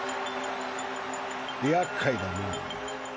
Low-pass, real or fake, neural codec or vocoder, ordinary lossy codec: none; real; none; none